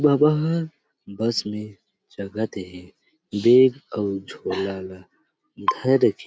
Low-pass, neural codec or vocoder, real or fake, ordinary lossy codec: none; none; real; none